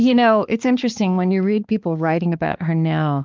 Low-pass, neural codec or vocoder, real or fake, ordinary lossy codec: 7.2 kHz; codec, 16 kHz, 2 kbps, X-Codec, HuBERT features, trained on balanced general audio; fake; Opus, 24 kbps